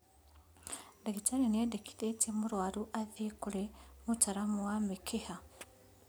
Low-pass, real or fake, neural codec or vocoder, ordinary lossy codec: none; real; none; none